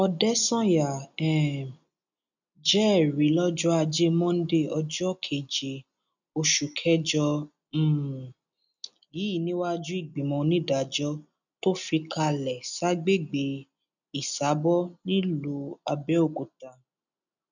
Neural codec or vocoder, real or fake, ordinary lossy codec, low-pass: none; real; none; 7.2 kHz